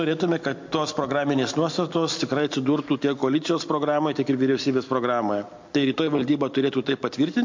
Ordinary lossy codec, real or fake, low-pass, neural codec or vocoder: MP3, 48 kbps; real; 7.2 kHz; none